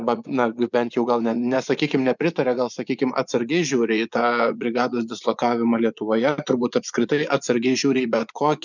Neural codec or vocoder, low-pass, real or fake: vocoder, 24 kHz, 100 mel bands, Vocos; 7.2 kHz; fake